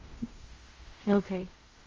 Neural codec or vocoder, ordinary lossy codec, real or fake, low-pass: codec, 16 kHz in and 24 kHz out, 0.4 kbps, LongCat-Audio-Codec, fine tuned four codebook decoder; Opus, 32 kbps; fake; 7.2 kHz